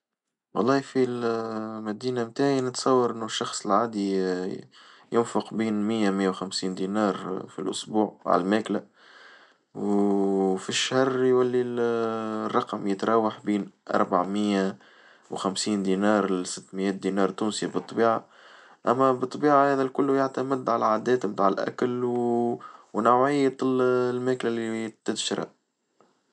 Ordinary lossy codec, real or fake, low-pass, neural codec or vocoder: none; real; 10.8 kHz; none